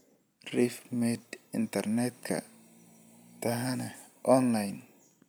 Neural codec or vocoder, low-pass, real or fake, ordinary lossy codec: none; none; real; none